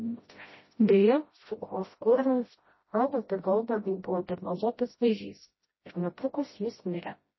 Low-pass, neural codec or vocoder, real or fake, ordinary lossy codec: 7.2 kHz; codec, 16 kHz, 0.5 kbps, FreqCodec, smaller model; fake; MP3, 24 kbps